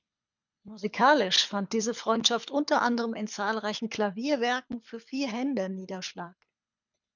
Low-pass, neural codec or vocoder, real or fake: 7.2 kHz; codec, 24 kHz, 6 kbps, HILCodec; fake